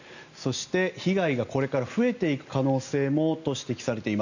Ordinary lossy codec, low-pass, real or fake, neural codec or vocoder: none; 7.2 kHz; real; none